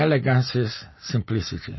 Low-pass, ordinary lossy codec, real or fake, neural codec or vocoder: 7.2 kHz; MP3, 24 kbps; real; none